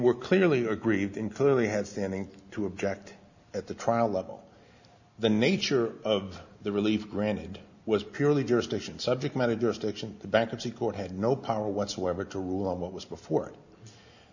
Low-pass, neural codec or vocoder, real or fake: 7.2 kHz; none; real